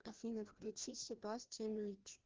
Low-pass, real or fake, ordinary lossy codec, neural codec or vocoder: 7.2 kHz; fake; Opus, 16 kbps; codec, 16 kHz, 1 kbps, FreqCodec, larger model